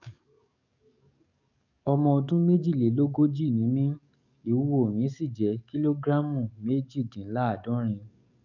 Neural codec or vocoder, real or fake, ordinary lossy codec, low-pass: codec, 44.1 kHz, 7.8 kbps, DAC; fake; none; 7.2 kHz